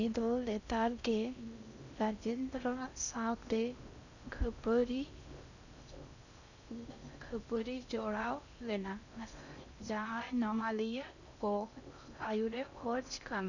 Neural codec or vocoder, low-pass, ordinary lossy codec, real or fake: codec, 16 kHz in and 24 kHz out, 0.6 kbps, FocalCodec, streaming, 4096 codes; 7.2 kHz; none; fake